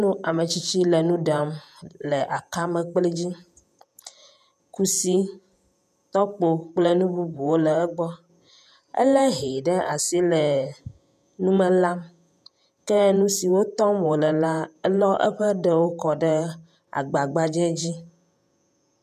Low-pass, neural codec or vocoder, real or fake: 14.4 kHz; vocoder, 48 kHz, 128 mel bands, Vocos; fake